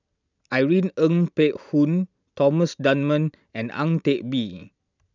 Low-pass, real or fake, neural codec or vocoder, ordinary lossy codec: 7.2 kHz; real; none; none